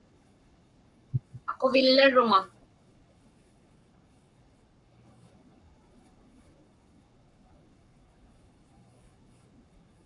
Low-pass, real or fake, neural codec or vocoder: 10.8 kHz; fake; codec, 44.1 kHz, 7.8 kbps, Pupu-Codec